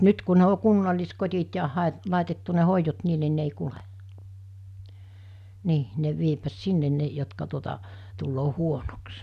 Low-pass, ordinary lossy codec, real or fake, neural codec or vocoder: 14.4 kHz; none; real; none